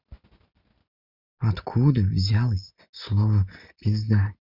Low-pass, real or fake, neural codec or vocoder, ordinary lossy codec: 5.4 kHz; real; none; Opus, 64 kbps